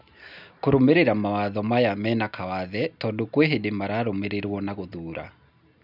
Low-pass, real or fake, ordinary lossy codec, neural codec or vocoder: 5.4 kHz; real; none; none